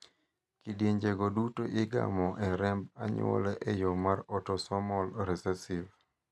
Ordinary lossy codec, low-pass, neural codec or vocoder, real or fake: none; none; none; real